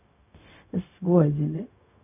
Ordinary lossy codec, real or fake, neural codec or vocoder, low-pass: none; fake; codec, 16 kHz, 0.4 kbps, LongCat-Audio-Codec; 3.6 kHz